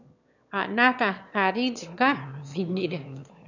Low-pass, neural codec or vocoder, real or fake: 7.2 kHz; autoencoder, 22.05 kHz, a latent of 192 numbers a frame, VITS, trained on one speaker; fake